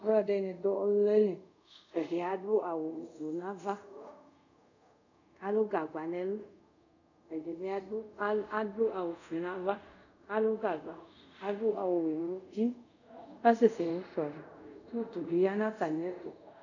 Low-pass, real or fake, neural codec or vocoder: 7.2 kHz; fake; codec, 24 kHz, 0.5 kbps, DualCodec